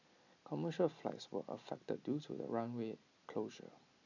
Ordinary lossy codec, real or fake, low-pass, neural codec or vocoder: none; real; 7.2 kHz; none